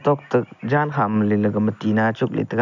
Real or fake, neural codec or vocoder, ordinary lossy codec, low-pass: real; none; none; 7.2 kHz